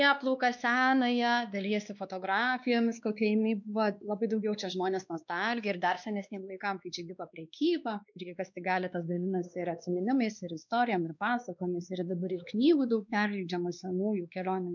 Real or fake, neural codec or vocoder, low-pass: fake; codec, 16 kHz, 2 kbps, X-Codec, WavLM features, trained on Multilingual LibriSpeech; 7.2 kHz